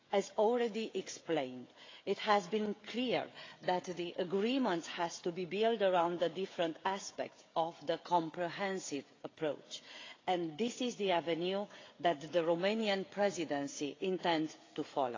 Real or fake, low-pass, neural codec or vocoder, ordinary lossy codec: fake; 7.2 kHz; codec, 16 kHz, 16 kbps, FreqCodec, smaller model; AAC, 32 kbps